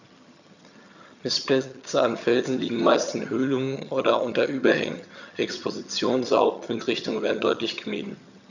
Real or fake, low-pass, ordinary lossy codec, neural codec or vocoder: fake; 7.2 kHz; none; vocoder, 22.05 kHz, 80 mel bands, HiFi-GAN